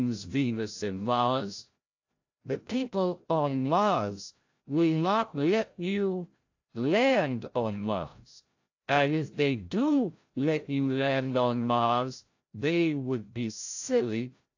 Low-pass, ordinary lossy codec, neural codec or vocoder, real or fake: 7.2 kHz; AAC, 48 kbps; codec, 16 kHz, 0.5 kbps, FreqCodec, larger model; fake